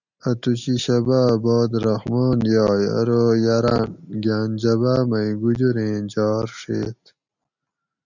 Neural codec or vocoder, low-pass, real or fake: none; 7.2 kHz; real